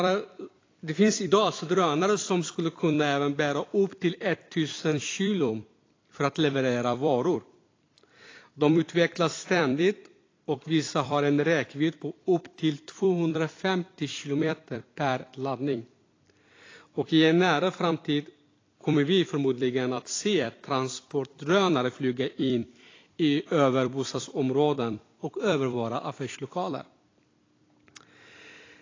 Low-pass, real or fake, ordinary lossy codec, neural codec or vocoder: 7.2 kHz; fake; AAC, 32 kbps; vocoder, 44.1 kHz, 128 mel bands every 256 samples, BigVGAN v2